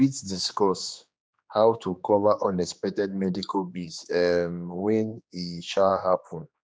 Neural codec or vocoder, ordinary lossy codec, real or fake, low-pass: codec, 16 kHz, 2 kbps, X-Codec, HuBERT features, trained on general audio; none; fake; none